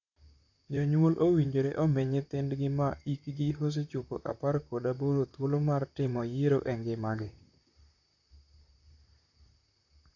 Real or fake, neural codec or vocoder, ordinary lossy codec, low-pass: fake; vocoder, 44.1 kHz, 128 mel bands, Pupu-Vocoder; AAC, 48 kbps; 7.2 kHz